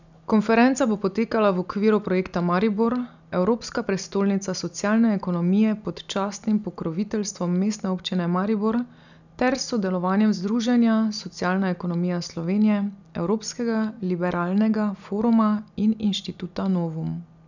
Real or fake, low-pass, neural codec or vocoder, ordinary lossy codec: real; 7.2 kHz; none; none